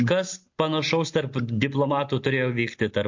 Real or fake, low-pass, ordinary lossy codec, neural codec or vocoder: real; 7.2 kHz; MP3, 48 kbps; none